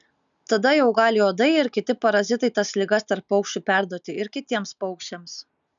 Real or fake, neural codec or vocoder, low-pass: real; none; 7.2 kHz